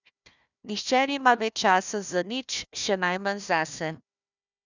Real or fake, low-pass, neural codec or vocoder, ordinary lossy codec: fake; 7.2 kHz; codec, 16 kHz, 1 kbps, FunCodec, trained on Chinese and English, 50 frames a second; none